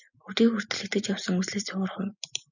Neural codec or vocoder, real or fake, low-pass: none; real; 7.2 kHz